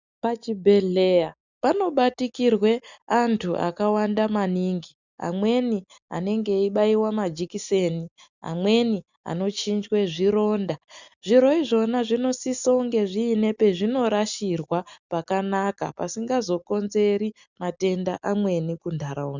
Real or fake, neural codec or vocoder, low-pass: real; none; 7.2 kHz